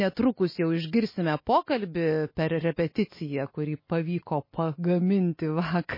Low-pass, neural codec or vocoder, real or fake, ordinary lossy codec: 5.4 kHz; none; real; MP3, 24 kbps